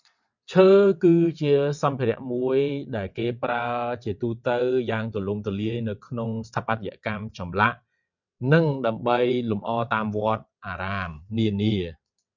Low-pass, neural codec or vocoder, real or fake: 7.2 kHz; vocoder, 22.05 kHz, 80 mel bands, WaveNeXt; fake